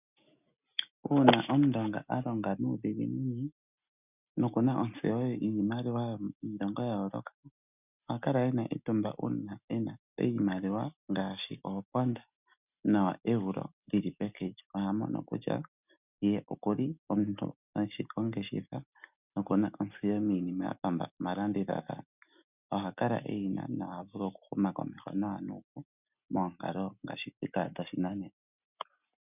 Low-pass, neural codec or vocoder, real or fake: 3.6 kHz; none; real